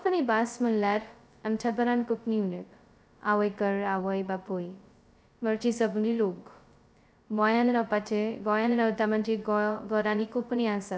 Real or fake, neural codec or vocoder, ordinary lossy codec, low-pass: fake; codec, 16 kHz, 0.2 kbps, FocalCodec; none; none